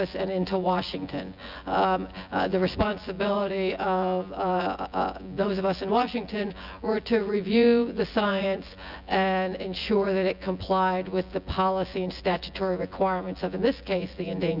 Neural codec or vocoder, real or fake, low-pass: vocoder, 24 kHz, 100 mel bands, Vocos; fake; 5.4 kHz